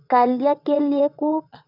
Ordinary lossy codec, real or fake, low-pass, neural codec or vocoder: none; fake; 5.4 kHz; vocoder, 22.05 kHz, 80 mel bands, WaveNeXt